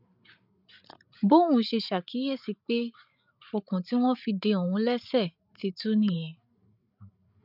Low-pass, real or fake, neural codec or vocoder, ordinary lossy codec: 5.4 kHz; fake; codec, 16 kHz, 8 kbps, FreqCodec, larger model; none